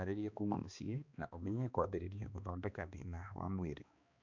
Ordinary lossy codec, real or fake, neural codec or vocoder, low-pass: none; fake; codec, 16 kHz, 2 kbps, X-Codec, HuBERT features, trained on balanced general audio; 7.2 kHz